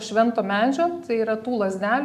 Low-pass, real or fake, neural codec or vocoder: 14.4 kHz; real; none